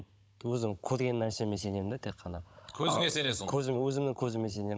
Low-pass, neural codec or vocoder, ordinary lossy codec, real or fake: none; codec, 16 kHz, 16 kbps, FunCodec, trained on Chinese and English, 50 frames a second; none; fake